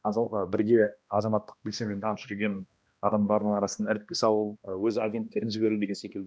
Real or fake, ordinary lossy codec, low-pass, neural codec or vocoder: fake; none; none; codec, 16 kHz, 1 kbps, X-Codec, HuBERT features, trained on balanced general audio